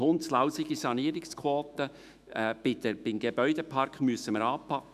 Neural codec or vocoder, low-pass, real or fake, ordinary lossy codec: autoencoder, 48 kHz, 128 numbers a frame, DAC-VAE, trained on Japanese speech; 14.4 kHz; fake; none